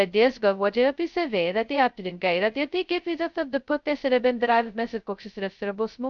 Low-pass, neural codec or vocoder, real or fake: 7.2 kHz; codec, 16 kHz, 0.2 kbps, FocalCodec; fake